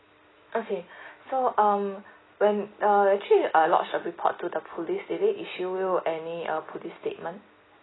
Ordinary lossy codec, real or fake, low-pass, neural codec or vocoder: AAC, 16 kbps; real; 7.2 kHz; none